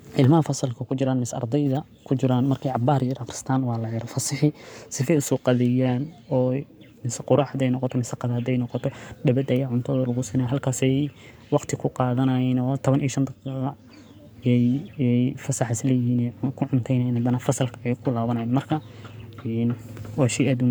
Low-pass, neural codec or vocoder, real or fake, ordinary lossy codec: none; codec, 44.1 kHz, 7.8 kbps, Pupu-Codec; fake; none